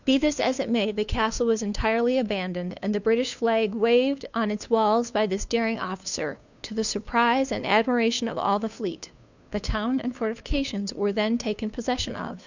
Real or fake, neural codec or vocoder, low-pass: fake; codec, 16 kHz, 2 kbps, FunCodec, trained on Chinese and English, 25 frames a second; 7.2 kHz